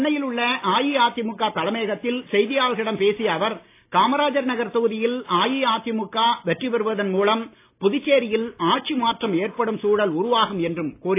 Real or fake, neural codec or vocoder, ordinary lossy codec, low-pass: real; none; AAC, 24 kbps; 3.6 kHz